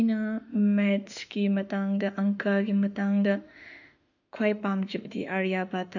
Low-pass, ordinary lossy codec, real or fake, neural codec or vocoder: 7.2 kHz; none; fake; autoencoder, 48 kHz, 32 numbers a frame, DAC-VAE, trained on Japanese speech